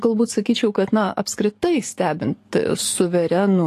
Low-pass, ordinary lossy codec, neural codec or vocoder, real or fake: 14.4 kHz; AAC, 48 kbps; codec, 44.1 kHz, 7.8 kbps, DAC; fake